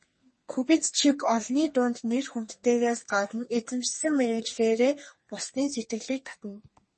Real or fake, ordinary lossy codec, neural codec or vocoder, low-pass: fake; MP3, 32 kbps; codec, 32 kHz, 1.9 kbps, SNAC; 10.8 kHz